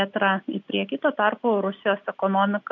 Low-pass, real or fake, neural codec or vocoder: 7.2 kHz; real; none